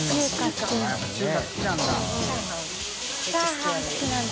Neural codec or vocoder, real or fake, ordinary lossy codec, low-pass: none; real; none; none